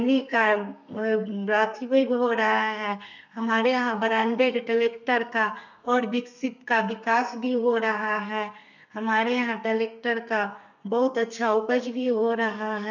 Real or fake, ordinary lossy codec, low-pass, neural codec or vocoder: fake; none; 7.2 kHz; codec, 32 kHz, 1.9 kbps, SNAC